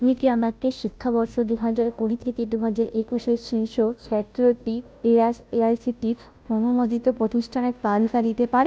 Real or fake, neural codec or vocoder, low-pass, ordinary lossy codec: fake; codec, 16 kHz, 0.5 kbps, FunCodec, trained on Chinese and English, 25 frames a second; none; none